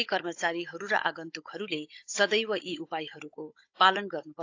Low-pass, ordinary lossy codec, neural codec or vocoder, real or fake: 7.2 kHz; AAC, 48 kbps; codec, 16 kHz, 16 kbps, FunCodec, trained on Chinese and English, 50 frames a second; fake